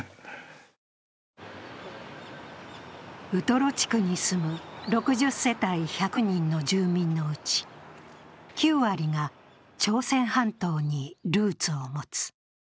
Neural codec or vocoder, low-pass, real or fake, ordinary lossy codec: none; none; real; none